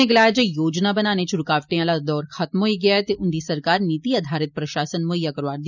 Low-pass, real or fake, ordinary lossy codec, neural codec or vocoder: 7.2 kHz; real; none; none